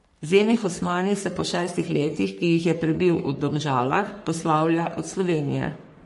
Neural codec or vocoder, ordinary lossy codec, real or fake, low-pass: codec, 44.1 kHz, 3.4 kbps, Pupu-Codec; MP3, 48 kbps; fake; 14.4 kHz